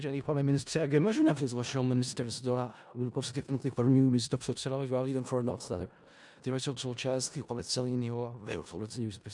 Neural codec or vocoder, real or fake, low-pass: codec, 16 kHz in and 24 kHz out, 0.4 kbps, LongCat-Audio-Codec, four codebook decoder; fake; 10.8 kHz